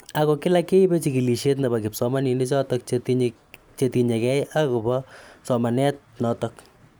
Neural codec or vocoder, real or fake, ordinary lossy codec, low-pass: vocoder, 44.1 kHz, 128 mel bands every 512 samples, BigVGAN v2; fake; none; none